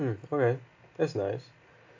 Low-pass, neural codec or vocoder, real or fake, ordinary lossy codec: 7.2 kHz; none; real; AAC, 48 kbps